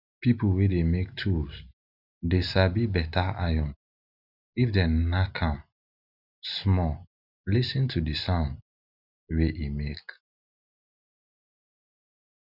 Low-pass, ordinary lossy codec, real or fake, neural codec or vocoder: 5.4 kHz; none; real; none